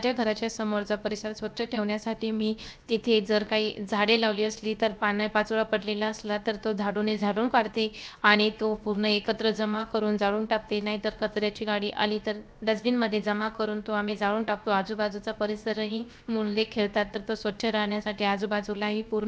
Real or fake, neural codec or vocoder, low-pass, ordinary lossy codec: fake; codec, 16 kHz, 0.7 kbps, FocalCodec; none; none